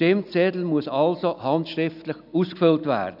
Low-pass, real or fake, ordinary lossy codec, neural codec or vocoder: 5.4 kHz; real; none; none